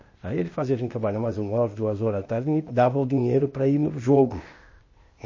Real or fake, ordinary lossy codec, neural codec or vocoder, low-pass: fake; MP3, 32 kbps; codec, 16 kHz, 0.8 kbps, ZipCodec; 7.2 kHz